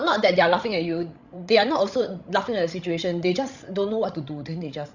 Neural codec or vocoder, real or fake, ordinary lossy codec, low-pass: codec, 16 kHz, 16 kbps, FreqCodec, larger model; fake; Opus, 64 kbps; 7.2 kHz